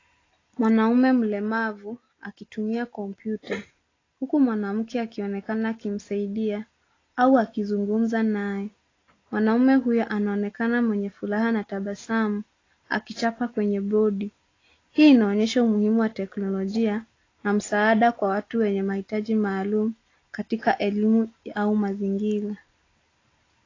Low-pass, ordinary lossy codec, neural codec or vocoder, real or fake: 7.2 kHz; AAC, 32 kbps; none; real